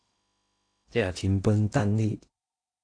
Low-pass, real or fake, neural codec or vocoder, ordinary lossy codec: 9.9 kHz; fake; codec, 16 kHz in and 24 kHz out, 0.8 kbps, FocalCodec, streaming, 65536 codes; AAC, 48 kbps